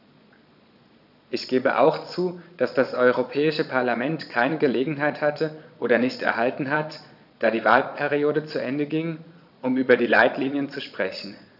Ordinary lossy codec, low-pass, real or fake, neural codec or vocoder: none; 5.4 kHz; fake; vocoder, 22.05 kHz, 80 mel bands, Vocos